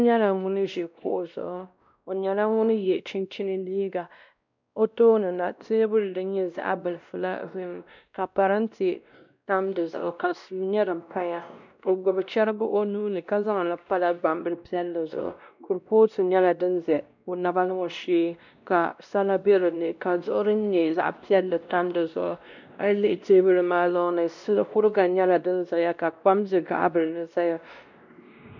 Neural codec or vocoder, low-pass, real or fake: codec, 16 kHz, 1 kbps, X-Codec, WavLM features, trained on Multilingual LibriSpeech; 7.2 kHz; fake